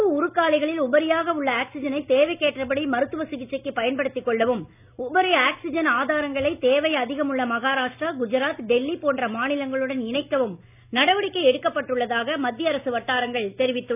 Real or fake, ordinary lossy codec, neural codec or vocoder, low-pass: real; none; none; 3.6 kHz